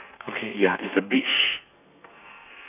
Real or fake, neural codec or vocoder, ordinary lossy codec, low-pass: fake; codec, 32 kHz, 1.9 kbps, SNAC; none; 3.6 kHz